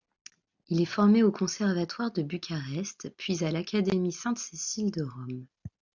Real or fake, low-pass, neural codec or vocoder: real; 7.2 kHz; none